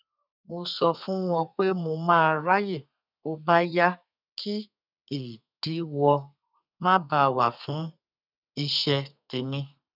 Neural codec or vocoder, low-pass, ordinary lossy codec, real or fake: codec, 32 kHz, 1.9 kbps, SNAC; 5.4 kHz; none; fake